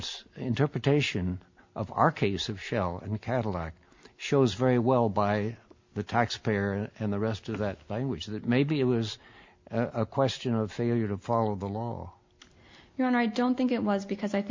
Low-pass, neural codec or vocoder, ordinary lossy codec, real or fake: 7.2 kHz; none; MP3, 48 kbps; real